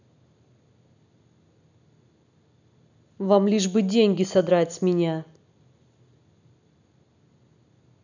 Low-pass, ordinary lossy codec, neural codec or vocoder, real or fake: 7.2 kHz; none; none; real